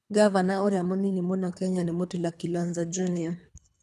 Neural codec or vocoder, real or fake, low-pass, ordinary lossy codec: codec, 24 kHz, 3 kbps, HILCodec; fake; none; none